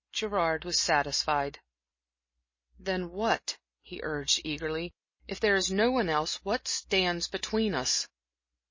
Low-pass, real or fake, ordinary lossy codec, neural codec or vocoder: 7.2 kHz; real; MP3, 32 kbps; none